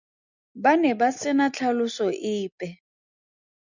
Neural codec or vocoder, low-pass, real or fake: none; 7.2 kHz; real